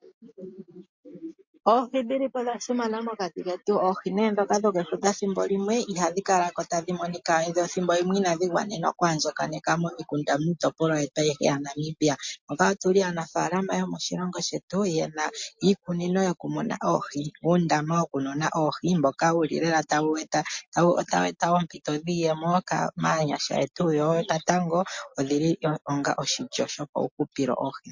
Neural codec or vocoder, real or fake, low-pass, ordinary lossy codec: none; real; 7.2 kHz; MP3, 48 kbps